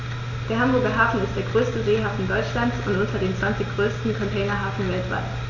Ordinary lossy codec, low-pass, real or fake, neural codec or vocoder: none; 7.2 kHz; real; none